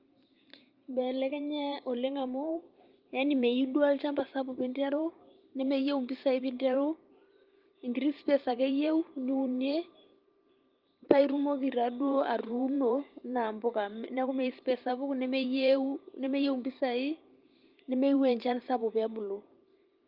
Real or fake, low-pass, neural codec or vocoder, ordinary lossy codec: fake; 5.4 kHz; vocoder, 44.1 kHz, 128 mel bands every 512 samples, BigVGAN v2; Opus, 32 kbps